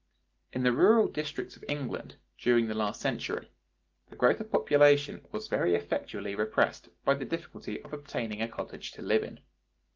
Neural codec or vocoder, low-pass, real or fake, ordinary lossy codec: none; 7.2 kHz; real; Opus, 32 kbps